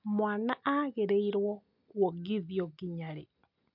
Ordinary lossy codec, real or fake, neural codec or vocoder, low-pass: MP3, 48 kbps; real; none; 5.4 kHz